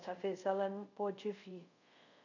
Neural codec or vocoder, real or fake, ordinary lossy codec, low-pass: codec, 24 kHz, 0.5 kbps, DualCodec; fake; none; 7.2 kHz